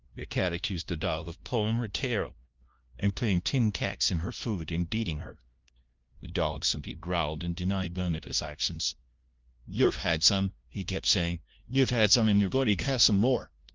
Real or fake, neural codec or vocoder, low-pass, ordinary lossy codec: fake; codec, 16 kHz, 0.5 kbps, FunCodec, trained on LibriTTS, 25 frames a second; 7.2 kHz; Opus, 24 kbps